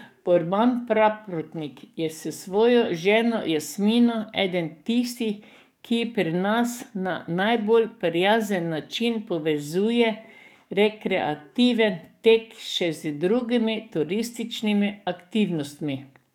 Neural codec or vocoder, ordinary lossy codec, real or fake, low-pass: codec, 44.1 kHz, 7.8 kbps, DAC; none; fake; 19.8 kHz